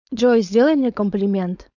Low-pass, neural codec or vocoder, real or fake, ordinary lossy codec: 7.2 kHz; codec, 16 kHz, 4.8 kbps, FACodec; fake; none